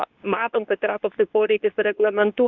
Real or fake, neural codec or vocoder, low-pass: fake; codec, 24 kHz, 0.9 kbps, WavTokenizer, medium speech release version 2; 7.2 kHz